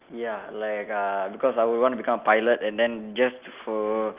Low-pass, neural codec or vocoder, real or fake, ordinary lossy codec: 3.6 kHz; none; real; Opus, 32 kbps